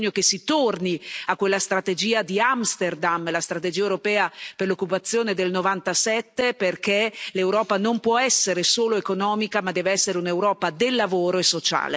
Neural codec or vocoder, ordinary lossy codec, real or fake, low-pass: none; none; real; none